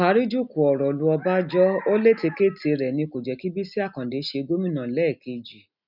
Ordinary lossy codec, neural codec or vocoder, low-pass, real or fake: none; none; 5.4 kHz; real